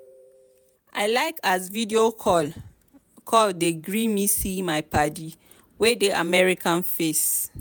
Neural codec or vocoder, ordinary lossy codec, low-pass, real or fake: vocoder, 48 kHz, 128 mel bands, Vocos; none; none; fake